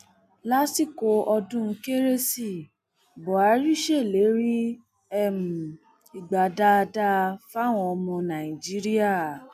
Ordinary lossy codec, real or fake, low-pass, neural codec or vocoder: none; real; 14.4 kHz; none